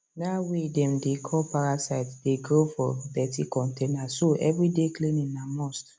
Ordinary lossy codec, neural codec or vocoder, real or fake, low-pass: none; none; real; none